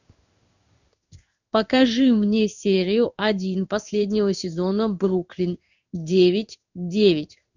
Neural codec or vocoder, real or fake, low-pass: codec, 16 kHz in and 24 kHz out, 1 kbps, XY-Tokenizer; fake; 7.2 kHz